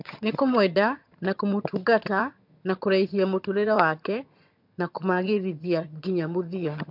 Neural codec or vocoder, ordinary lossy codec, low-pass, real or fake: vocoder, 22.05 kHz, 80 mel bands, HiFi-GAN; MP3, 48 kbps; 5.4 kHz; fake